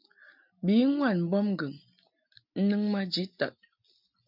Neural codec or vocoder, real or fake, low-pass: none; real; 5.4 kHz